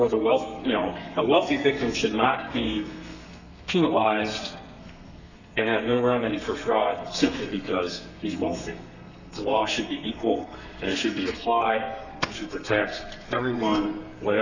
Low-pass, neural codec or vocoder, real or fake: 7.2 kHz; codec, 32 kHz, 1.9 kbps, SNAC; fake